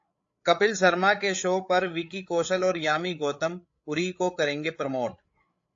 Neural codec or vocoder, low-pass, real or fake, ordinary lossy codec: codec, 16 kHz, 8 kbps, FreqCodec, larger model; 7.2 kHz; fake; AAC, 48 kbps